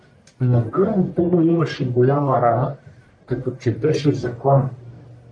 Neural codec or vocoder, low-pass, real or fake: codec, 44.1 kHz, 1.7 kbps, Pupu-Codec; 9.9 kHz; fake